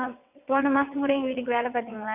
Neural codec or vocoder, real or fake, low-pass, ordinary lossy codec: vocoder, 22.05 kHz, 80 mel bands, WaveNeXt; fake; 3.6 kHz; none